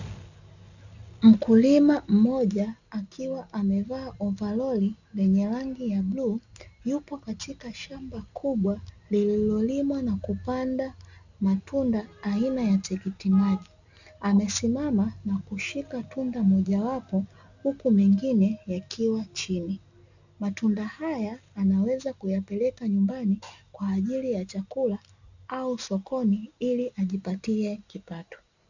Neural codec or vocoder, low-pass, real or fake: none; 7.2 kHz; real